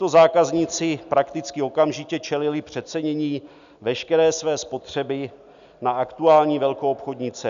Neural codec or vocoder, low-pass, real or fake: none; 7.2 kHz; real